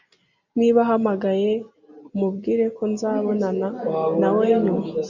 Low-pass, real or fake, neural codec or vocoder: 7.2 kHz; real; none